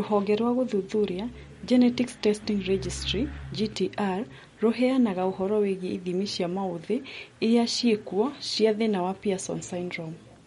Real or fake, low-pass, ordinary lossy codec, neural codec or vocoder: real; 19.8 kHz; MP3, 48 kbps; none